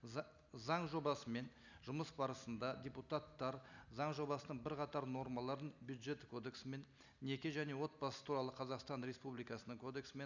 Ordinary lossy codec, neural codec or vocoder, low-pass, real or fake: none; none; 7.2 kHz; real